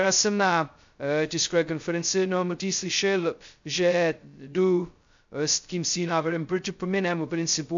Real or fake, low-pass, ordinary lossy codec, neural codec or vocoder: fake; 7.2 kHz; MP3, 64 kbps; codec, 16 kHz, 0.2 kbps, FocalCodec